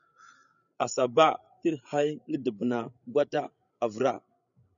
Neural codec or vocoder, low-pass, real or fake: codec, 16 kHz, 8 kbps, FreqCodec, larger model; 7.2 kHz; fake